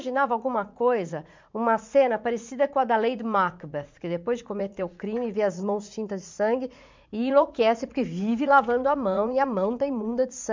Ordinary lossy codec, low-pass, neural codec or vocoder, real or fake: MP3, 64 kbps; 7.2 kHz; vocoder, 44.1 kHz, 80 mel bands, Vocos; fake